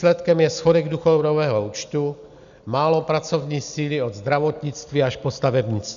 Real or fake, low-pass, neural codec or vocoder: real; 7.2 kHz; none